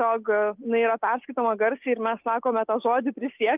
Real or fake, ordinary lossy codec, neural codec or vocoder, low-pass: real; Opus, 24 kbps; none; 3.6 kHz